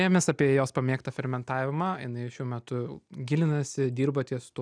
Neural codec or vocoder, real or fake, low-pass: none; real; 9.9 kHz